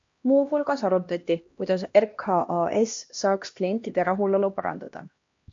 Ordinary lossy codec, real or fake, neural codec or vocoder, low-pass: MP3, 48 kbps; fake; codec, 16 kHz, 1 kbps, X-Codec, HuBERT features, trained on LibriSpeech; 7.2 kHz